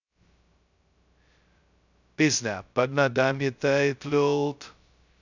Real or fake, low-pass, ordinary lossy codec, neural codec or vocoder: fake; 7.2 kHz; none; codec, 16 kHz, 0.2 kbps, FocalCodec